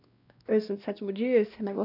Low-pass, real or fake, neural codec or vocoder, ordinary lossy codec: 5.4 kHz; fake; codec, 16 kHz, 1 kbps, X-Codec, WavLM features, trained on Multilingual LibriSpeech; none